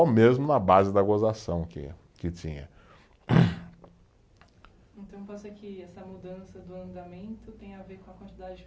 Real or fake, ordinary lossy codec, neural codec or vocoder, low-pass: real; none; none; none